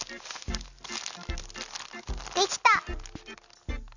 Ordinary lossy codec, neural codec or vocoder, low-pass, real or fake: none; none; 7.2 kHz; real